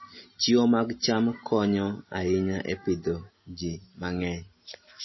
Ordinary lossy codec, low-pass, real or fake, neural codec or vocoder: MP3, 24 kbps; 7.2 kHz; real; none